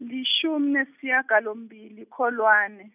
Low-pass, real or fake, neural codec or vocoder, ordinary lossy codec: 3.6 kHz; real; none; none